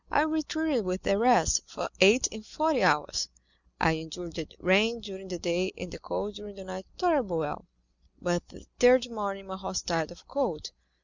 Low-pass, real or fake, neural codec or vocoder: 7.2 kHz; real; none